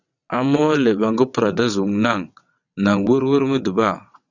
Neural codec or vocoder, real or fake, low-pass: vocoder, 22.05 kHz, 80 mel bands, WaveNeXt; fake; 7.2 kHz